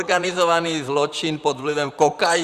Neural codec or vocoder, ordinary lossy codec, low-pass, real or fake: vocoder, 44.1 kHz, 128 mel bands, Pupu-Vocoder; Opus, 64 kbps; 14.4 kHz; fake